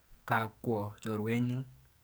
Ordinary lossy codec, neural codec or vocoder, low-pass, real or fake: none; codec, 44.1 kHz, 2.6 kbps, SNAC; none; fake